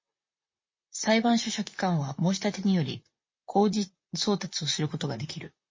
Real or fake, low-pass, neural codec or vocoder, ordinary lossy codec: fake; 7.2 kHz; vocoder, 44.1 kHz, 128 mel bands, Pupu-Vocoder; MP3, 32 kbps